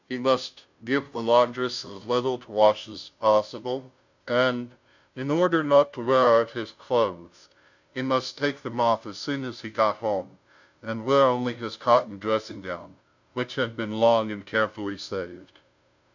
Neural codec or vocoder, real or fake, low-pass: codec, 16 kHz, 0.5 kbps, FunCodec, trained on Chinese and English, 25 frames a second; fake; 7.2 kHz